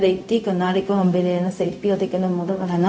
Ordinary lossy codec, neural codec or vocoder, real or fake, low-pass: none; codec, 16 kHz, 0.4 kbps, LongCat-Audio-Codec; fake; none